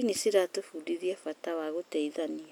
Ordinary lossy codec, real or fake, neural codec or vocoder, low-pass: none; real; none; none